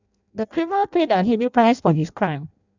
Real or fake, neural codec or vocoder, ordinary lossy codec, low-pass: fake; codec, 16 kHz in and 24 kHz out, 0.6 kbps, FireRedTTS-2 codec; none; 7.2 kHz